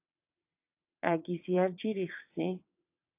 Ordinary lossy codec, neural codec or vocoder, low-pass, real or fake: AAC, 32 kbps; vocoder, 22.05 kHz, 80 mel bands, WaveNeXt; 3.6 kHz; fake